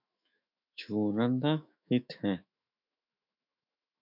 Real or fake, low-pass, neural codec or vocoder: fake; 5.4 kHz; autoencoder, 48 kHz, 128 numbers a frame, DAC-VAE, trained on Japanese speech